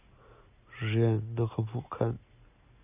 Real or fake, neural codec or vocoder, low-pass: real; none; 3.6 kHz